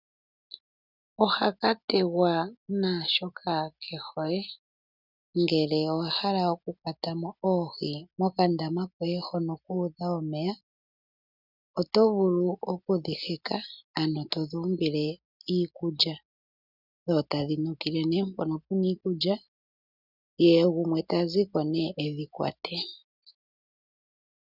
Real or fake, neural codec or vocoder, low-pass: real; none; 5.4 kHz